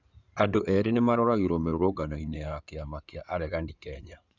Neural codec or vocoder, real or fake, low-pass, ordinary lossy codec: codec, 16 kHz in and 24 kHz out, 2.2 kbps, FireRedTTS-2 codec; fake; 7.2 kHz; none